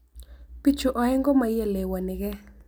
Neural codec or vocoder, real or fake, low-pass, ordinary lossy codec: none; real; none; none